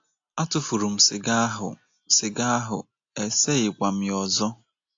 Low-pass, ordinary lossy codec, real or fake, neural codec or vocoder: 7.2 kHz; AAC, 48 kbps; real; none